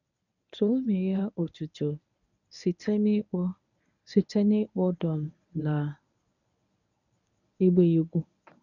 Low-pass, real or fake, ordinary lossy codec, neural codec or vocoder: 7.2 kHz; fake; none; codec, 24 kHz, 0.9 kbps, WavTokenizer, medium speech release version 1